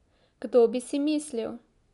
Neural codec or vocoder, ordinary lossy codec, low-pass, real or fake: none; none; 10.8 kHz; real